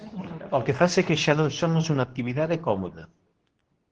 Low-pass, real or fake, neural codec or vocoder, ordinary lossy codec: 9.9 kHz; fake; codec, 24 kHz, 0.9 kbps, WavTokenizer, medium speech release version 2; Opus, 16 kbps